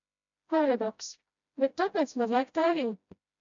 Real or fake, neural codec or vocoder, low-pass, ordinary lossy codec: fake; codec, 16 kHz, 0.5 kbps, FreqCodec, smaller model; 7.2 kHz; MP3, 64 kbps